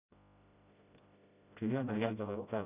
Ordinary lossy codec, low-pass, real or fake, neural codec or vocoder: none; 3.6 kHz; fake; codec, 16 kHz, 0.5 kbps, FreqCodec, smaller model